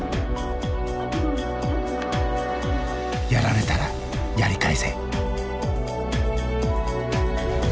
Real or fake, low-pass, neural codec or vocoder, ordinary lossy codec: real; none; none; none